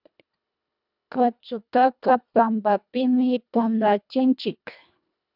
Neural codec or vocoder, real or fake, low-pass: codec, 24 kHz, 1.5 kbps, HILCodec; fake; 5.4 kHz